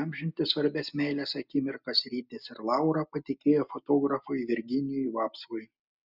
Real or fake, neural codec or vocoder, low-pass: real; none; 5.4 kHz